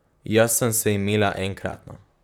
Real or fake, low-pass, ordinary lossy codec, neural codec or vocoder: fake; none; none; vocoder, 44.1 kHz, 128 mel bands, Pupu-Vocoder